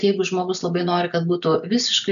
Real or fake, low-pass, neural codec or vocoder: real; 7.2 kHz; none